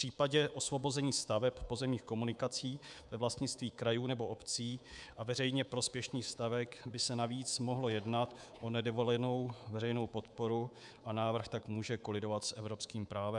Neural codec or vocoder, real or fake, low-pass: codec, 24 kHz, 3.1 kbps, DualCodec; fake; 10.8 kHz